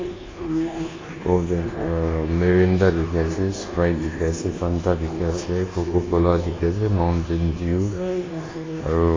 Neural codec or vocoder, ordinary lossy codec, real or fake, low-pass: codec, 24 kHz, 1.2 kbps, DualCodec; AAC, 32 kbps; fake; 7.2 kHz